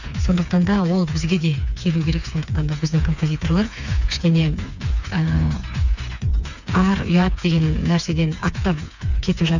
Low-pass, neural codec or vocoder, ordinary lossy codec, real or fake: 7.2 kHz; codec, 16 kHz, 4 kbps, FreqCodec, smaller model; none; fake